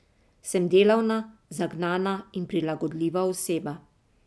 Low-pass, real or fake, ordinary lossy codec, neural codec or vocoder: none; real; none; none